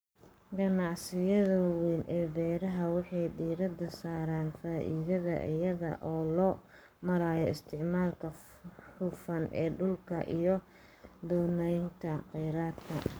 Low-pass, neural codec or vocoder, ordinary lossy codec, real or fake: none; codec, 44.1 kHz, 7.8 kbps, Pupu-Codec; none; fake